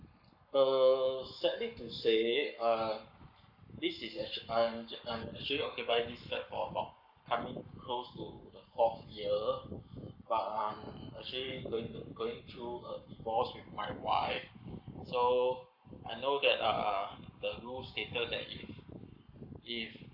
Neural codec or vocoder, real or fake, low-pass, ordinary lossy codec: codec, 44.1 kHz, 7.8 kbps, Pupu-Codec; fake; 5.4 kHz; none